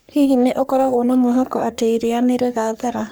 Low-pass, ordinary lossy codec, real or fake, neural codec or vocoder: none; none; fake; codec, 44.1 kHz, 3.4 kbps, Pupu-Codec